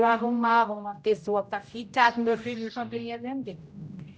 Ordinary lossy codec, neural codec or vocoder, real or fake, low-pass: none; codec, 16 kHz, 0.5 kbps, X-Codec, HuBERT features, trained on general audio; fake; none